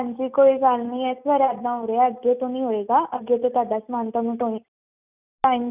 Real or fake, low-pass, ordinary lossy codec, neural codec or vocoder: real; 3.6 kHz; none; none